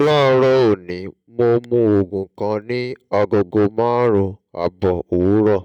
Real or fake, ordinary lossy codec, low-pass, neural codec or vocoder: real; none; 19.8 kHz; none